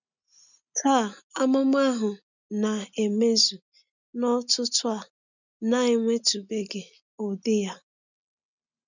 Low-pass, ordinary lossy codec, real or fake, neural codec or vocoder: 7.2 kHz; none; real; none